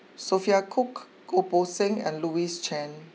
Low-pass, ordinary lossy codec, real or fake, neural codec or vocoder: none; none; real; none